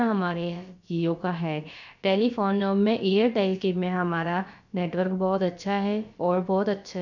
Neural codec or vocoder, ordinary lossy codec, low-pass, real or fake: codec, 16 kHz, about 1 kbps, DyCAST, with the encoder's durations; none; 7.2 kHz; fake